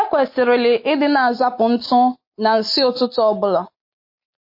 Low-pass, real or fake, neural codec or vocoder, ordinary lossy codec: 5.4 kHz; real; none; MP3, 32 kbps